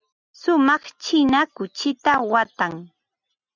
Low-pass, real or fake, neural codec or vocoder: 7.2 kHz; real; none